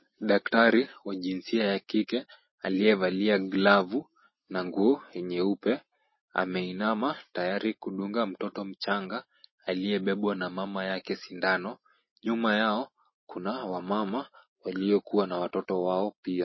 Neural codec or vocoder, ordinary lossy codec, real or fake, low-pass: none; MP3, 24 kbps; real; 7.2 kHz